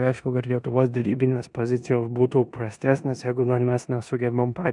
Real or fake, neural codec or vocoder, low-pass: fake; codec, 16 kHz in and 24 kHz out, 0.9 kbps, LongCat-Audio-Codec, four codebook decoder; 10.8 kHz